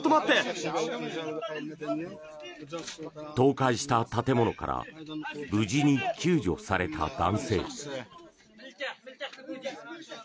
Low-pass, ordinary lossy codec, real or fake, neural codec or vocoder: none; none; real; none